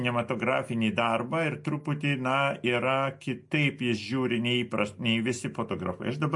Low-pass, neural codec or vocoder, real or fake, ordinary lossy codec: 10.8 kHz; none; real; MP3, 48 kbps